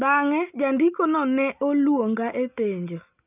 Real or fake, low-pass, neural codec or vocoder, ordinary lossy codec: real; 3.6 kHz; none; none